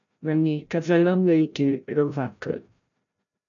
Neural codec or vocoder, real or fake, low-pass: codec, 16 kHz, 0.5 kbps, FreqCodec, larger model; fake; 7.2 kHz